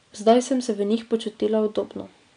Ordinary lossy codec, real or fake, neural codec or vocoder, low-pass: none; real; none; 9.9 kHz